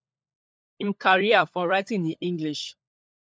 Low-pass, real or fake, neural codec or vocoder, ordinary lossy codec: none; fake; codec, 16 kHz, 16 kbps, FunCodec, trained on LibriTTS, 50 frames a second; none